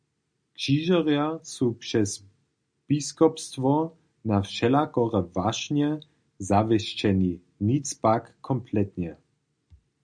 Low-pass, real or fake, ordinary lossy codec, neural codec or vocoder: 9.9 kHz; real; MP3, 96 kbps; none